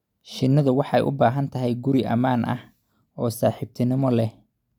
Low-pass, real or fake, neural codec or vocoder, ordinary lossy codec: 19.8 kHz; real; none; none